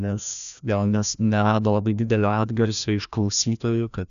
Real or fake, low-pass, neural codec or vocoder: fake; 7.2 kHz; codec, 16 kHz, 1 kbps, FreqCodec, larger model